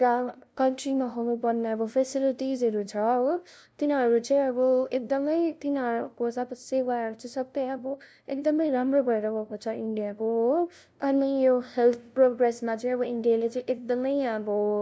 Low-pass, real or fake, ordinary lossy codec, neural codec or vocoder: none; fake; none; codec, 16 kHz, 0.5 kbps, FunCodec, trained on LibriTTS, 25 frames a second